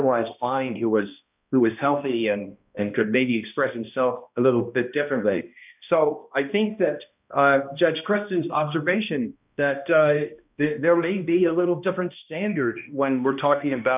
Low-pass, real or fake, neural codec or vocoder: 3.6 kHz; fake; codec, 16 kHz, 1 kbps, X-Codec, HuBERT features, trained on balanced general audio